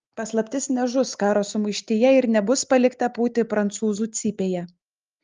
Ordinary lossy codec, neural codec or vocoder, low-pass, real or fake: Opus, 32 kbps; none; 7.2 kHz; real